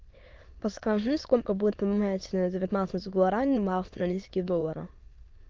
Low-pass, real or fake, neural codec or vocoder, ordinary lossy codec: 7.2 kHz; fake; autoencoder, 22.05 kHz, a latent of 192 numbers a frame, VITS, trained on many speakers; Opus, 32 kbps